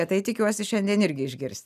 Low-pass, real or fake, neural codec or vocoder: 14.4 kHz; real; none